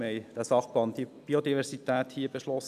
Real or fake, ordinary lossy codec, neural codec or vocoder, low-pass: real; none; none; 14.4 kHz